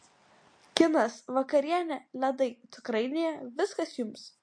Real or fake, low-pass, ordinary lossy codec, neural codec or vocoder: real; 9.9 kHz; MP3, 48 kbps; none